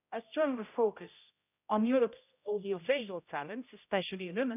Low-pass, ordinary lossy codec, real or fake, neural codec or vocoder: 3.6 kHz; none; fake; codec, 16 kHz, 0.5 kbps, X-Codec, HuBERT features, trained on general audio